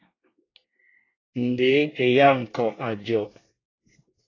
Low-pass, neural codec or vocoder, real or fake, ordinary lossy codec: 7.2 kHz; codec, 24 kHz, 1 kbps, SNAC; fake; AAC, 32 kbps